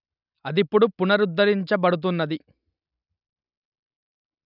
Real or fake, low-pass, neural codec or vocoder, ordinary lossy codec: real; 5.4 kHz; none; none